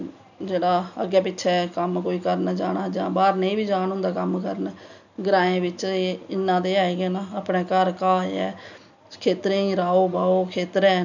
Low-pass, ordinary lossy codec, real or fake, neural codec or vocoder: 7.2 kHz; none; real; none